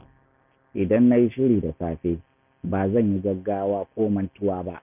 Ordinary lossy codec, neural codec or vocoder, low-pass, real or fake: none; none; 3.6 kHz; real